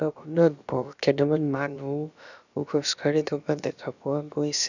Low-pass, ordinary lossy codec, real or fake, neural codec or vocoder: 7.2 kHz; none; fake; codec, 16 kHz, about 1 kbps, DyCAST, with the encoder's durations